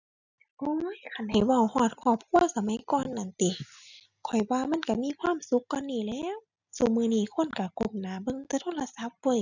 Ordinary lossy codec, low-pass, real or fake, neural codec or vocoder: none; 7.2 kHz; real; none